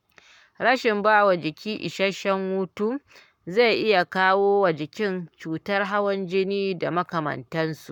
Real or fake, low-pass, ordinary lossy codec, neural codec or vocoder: fake; 19.8 kHz; none; codec, 44.1 kHz, 7.8 kbps, Pupu-Codec